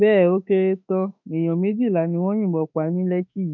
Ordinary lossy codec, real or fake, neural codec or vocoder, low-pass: none; fake; autoencoder, 48 kHz, 32 numbers a frame, DAC-VAE, trained on Japanese speech; 7.2 kHz